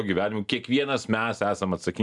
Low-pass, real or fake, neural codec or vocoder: 10.8 kHz; real; none